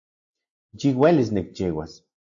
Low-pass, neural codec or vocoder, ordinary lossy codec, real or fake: 7.2 kHz; none; AAC, 64 kbps; real